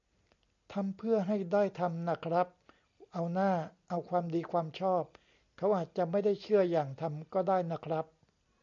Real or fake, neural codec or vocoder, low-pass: real; none; 7.2 kHz